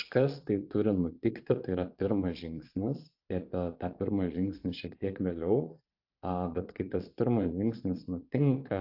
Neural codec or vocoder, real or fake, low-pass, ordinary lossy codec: vocoder, 22.05 kHz, 80 mel bands, Vocos; fake; 5.4 kHz; AAC, 48 kbps